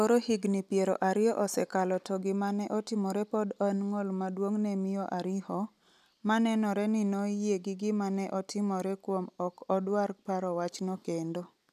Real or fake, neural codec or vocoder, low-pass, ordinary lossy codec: real; none; 14.4 kHz; none